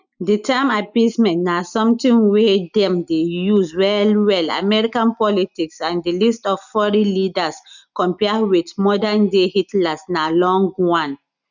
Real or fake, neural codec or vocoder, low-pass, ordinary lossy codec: real; none; 7.2 kHz; none